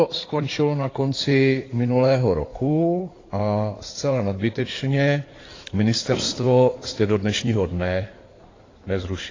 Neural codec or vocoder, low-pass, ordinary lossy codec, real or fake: codec, 16 kHz in and 24 kHz out, 2.2 kbps, FireRedTTS-2 codec; 7.2 kHz; AAC, 32 kbps; fake